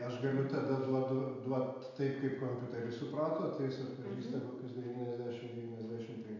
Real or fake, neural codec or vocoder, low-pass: real; none; 7.2 kHz